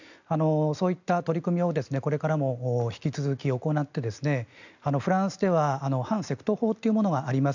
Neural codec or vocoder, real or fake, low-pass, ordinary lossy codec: none; real; 7.2 kHz; none